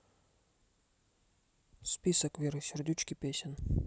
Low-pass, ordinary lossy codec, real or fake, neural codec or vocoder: none; none; real; none